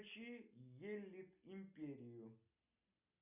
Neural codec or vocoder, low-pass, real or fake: none; 3.6 kHz; real